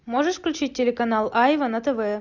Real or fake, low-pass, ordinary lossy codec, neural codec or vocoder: real; 7.2 kHz; Opus, 64 kbps; none